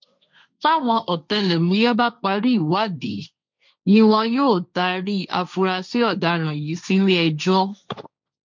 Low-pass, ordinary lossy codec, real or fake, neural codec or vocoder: 7.2 kHz; MP3, 64 kbps; fake; codec, 16 kHz, 1.1 kbps, Voila-Tokenizer